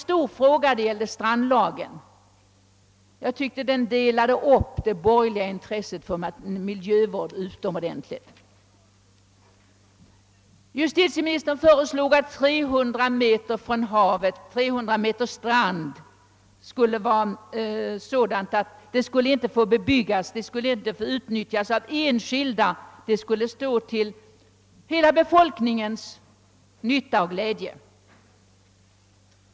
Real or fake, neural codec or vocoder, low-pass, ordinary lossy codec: real; none; none; none